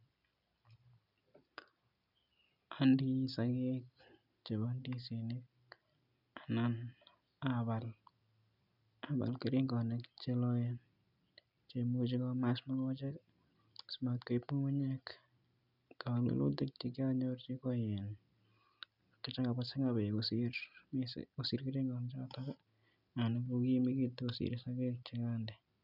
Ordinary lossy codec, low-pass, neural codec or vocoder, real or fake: none; 5.4 kHz; none; real